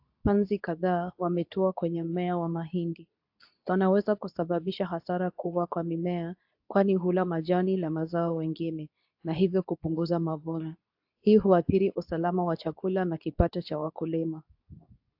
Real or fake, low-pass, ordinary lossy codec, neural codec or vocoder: fake; 5.4 kHz; AAC, 48 kbps; codec, 24 kHz, 0.9 kbps, WavTokenizer, medium speech release version 2